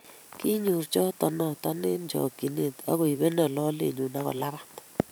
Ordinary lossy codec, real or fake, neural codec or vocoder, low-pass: none; fake; vocoder, 44.1 kHz, 128 mel bands every 512 samples, BigVGAN v2; none